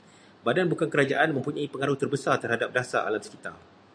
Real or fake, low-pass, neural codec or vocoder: real; 9.9 kHz; none